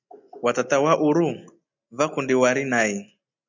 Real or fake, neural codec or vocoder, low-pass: real; none; 7.2 kHz